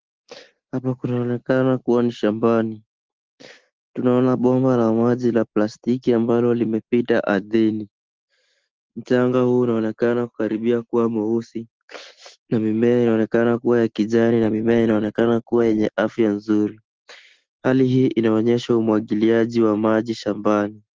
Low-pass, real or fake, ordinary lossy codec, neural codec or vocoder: 7.2 kHz; real; Opus, 16 kbps; none